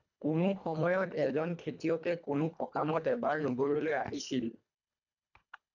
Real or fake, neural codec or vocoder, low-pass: fake; codec, 24 kHz, 1.5 kbps, HILCodec; 7.2 kHz